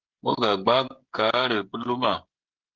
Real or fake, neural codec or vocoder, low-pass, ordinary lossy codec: fake; codec, 16 kHz, 16 kbps, FreqCodec, smaller model; 7.2 kHz; Opus, 16 kbps